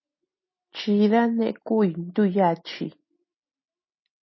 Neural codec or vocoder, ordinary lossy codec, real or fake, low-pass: none; MP3, 24 kbps; real; 7.2 kHz